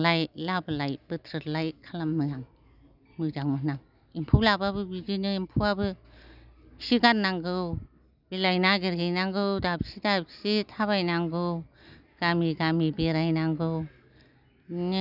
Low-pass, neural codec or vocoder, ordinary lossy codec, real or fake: 5.4 kHz; none; none; real